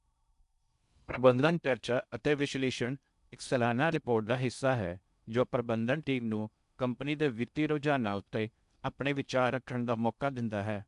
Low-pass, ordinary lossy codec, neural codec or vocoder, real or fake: 10.8 kHz; none; codec, 16 kHz in and 24 kHz out, 0.6 kbps, FocalCodec, streaming, 2048 codes; fake